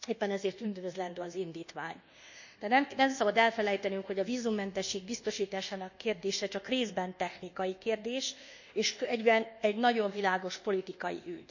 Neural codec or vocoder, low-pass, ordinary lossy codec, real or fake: codec, 24 kHz, 1.2 kbps, DualCodec; 7.2 kHz; none; fake